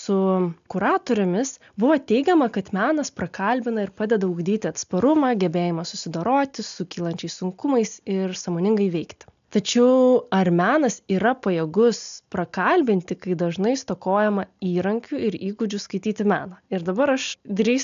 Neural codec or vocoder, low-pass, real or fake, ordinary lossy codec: none; 7.2 kHz; real; AAC, 96 kbps